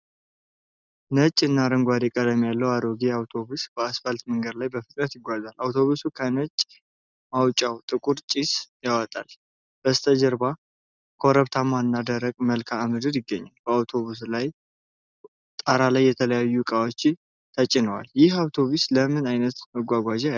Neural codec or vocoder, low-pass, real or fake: none; 7.2 kHz; real